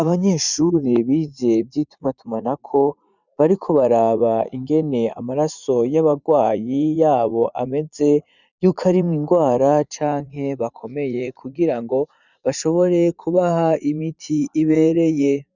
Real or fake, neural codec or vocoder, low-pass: fake; vocoder, 44.1 kHz, 80 mel bands, Vocos; 7.2 kHz